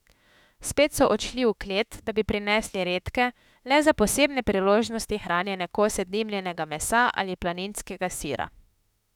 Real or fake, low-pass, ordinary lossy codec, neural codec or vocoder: fake; 19.8 kHz; none; autoencoder, 48 kHz, 32 numbers a frame, DAC-VAE, trained on Japanese speech